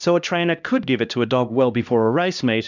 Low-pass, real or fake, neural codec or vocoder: 7.2 kHz; fake; codec, 16 kHz, 1 kbps, X-Codec, HuBERT features, trained on LibriSpeech